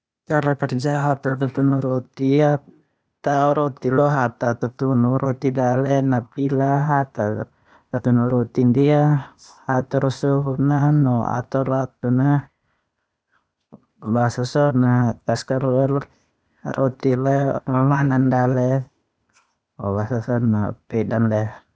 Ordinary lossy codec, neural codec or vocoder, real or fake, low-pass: none; codec, 16 kHz, 0.8 kbps, ZipCodec; fake; none